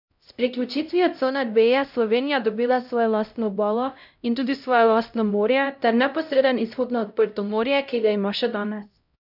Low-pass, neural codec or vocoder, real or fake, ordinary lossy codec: 5.4 kHz; codec, 16 kHz, 0.5 kbps, X-Codec, HuBERT features, trained on LibriSpeech; fake; none